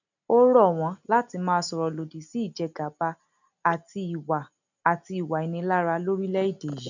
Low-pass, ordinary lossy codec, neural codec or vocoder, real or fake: 7.2 kHz; none; none; real